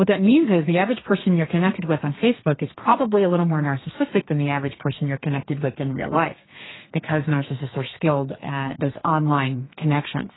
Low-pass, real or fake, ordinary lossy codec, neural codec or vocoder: 7.2 kHz; fake; AAC, 16 kbps; codec, 32 kHz, 1.9 kbps, SNAC